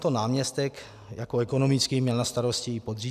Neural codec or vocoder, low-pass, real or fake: none; 14.4 kHz; real